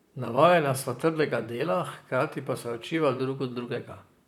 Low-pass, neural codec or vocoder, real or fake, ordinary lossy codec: 19.8 kHz; vocoder, 44.1 kHz, 128 mel bands, Pupu-Vocoder; fake; none